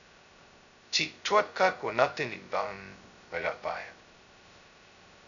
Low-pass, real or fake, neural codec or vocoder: 7.2 kHz; fake; codec, 16 kHz, 0.2 kbps, FocalCodec